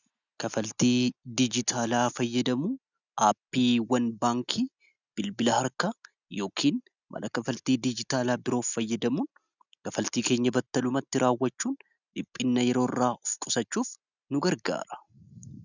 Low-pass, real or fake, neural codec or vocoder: 7.2 kHz; real; none